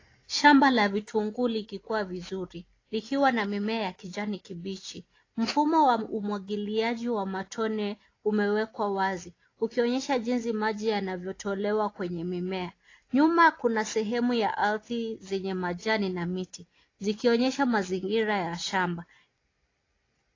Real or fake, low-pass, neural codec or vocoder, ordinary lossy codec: real; 7.2 kHz; none; AAC, 32 kbps